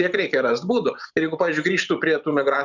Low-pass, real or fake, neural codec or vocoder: 7.2 kHz; fake; vocoder, 44.1 kHz, 128 mel bands every 512 samples, BigVGAN v2